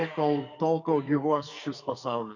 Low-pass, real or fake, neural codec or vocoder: 7.2 kHz; fake; codec, 44.1 kHz, 2.6 kbps, SNAC